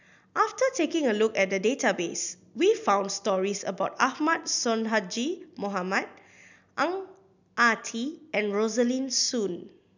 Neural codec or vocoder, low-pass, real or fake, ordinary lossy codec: none; 7.2 kHz; real; none